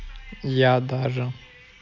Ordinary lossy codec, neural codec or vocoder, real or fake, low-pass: none; none; real; 7.2 kHz